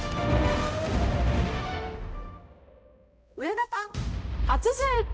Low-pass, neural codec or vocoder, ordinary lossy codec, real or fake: none; codec, 16 kHz, 0.5 kbps, X-Codec, HuBERT features, trained on balanced general audio; none; fake